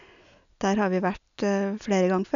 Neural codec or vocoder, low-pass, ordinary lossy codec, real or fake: none; 7.2 kHz; none; real